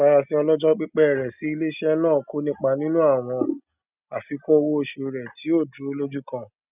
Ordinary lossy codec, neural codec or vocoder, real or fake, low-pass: none; none; real; 3.6 kHz